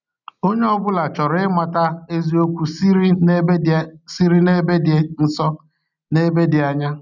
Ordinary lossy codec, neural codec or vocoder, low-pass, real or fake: none; none; 7.2 kHz; real